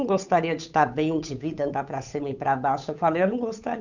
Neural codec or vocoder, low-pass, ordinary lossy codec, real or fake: codec, 16 kHz, 8 kbps, FunCodec, trained on Chinese and English, 25 frames a second; 7.2 kHz; none; fake